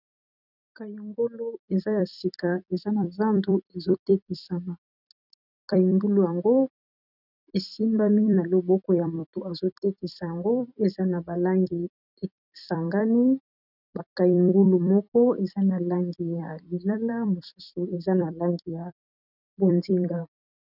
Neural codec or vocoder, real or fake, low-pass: vocoder, 44.1 kHz, 128 mel bands every 256 samples, BigVGAN v2; fake; 5.4 kHz